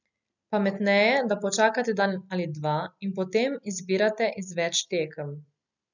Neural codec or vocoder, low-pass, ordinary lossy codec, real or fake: none; 7.2 kHz; none; real